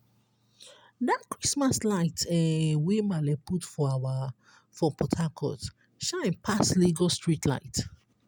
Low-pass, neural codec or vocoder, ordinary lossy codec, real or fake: none; none; none; real